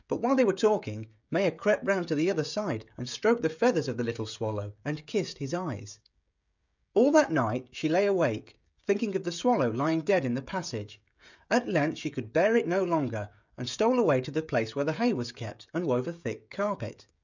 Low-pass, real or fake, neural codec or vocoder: 7.2 kHz; fake; codec, 16 kHz, 16 kbps, FreqCodec, smaller model